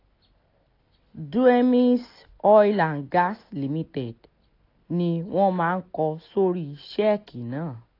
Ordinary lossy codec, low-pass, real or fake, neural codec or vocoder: AAC, 32 kbps; 5.4 kHz; real; none